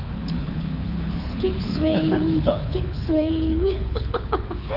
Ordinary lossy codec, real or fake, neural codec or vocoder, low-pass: none; fake; codec, 24 kHz, 6 kbps, HILCodec; 5.4 kHz